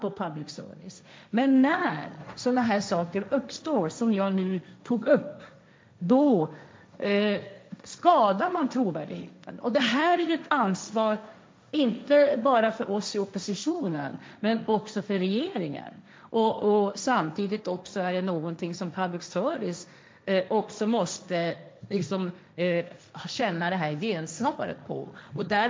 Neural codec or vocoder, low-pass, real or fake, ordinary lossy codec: codec, 16 kHz, 1.1 kbps, Voila-Tokenizer; none; fake; none